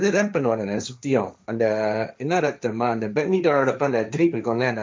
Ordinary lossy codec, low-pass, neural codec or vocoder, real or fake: none; none; codec, 16 kHz, 1.1 kbps, Voila-Tokenizer; fake